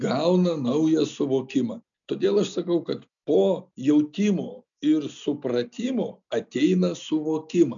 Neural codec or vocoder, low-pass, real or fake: none; 7.2 kHz; real